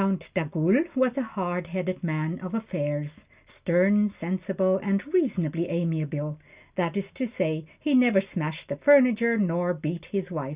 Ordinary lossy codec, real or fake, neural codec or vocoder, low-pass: Opus, 64 kbps; real; none; 3.6 kHz